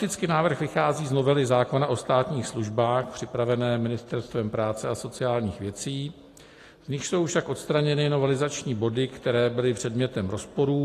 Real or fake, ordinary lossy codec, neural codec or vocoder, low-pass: real; AAC, 48 kbps; none; 14.4 kHz